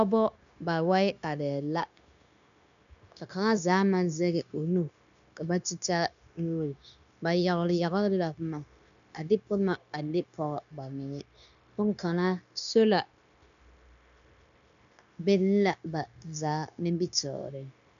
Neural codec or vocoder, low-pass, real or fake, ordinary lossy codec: codec, 16 kHz, 0.9 kbps, LongCat-Audio-Codec; 7.2 kHz; fake; MP3, 96 kbps